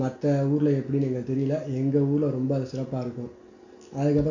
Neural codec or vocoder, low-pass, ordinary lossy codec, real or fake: none; 7.2 kHz; none; real